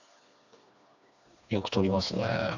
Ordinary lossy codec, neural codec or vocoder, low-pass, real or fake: none; codec, 16 kHz, 2 kbps, FreqCodec, smaller model; 7.2 kHz; fake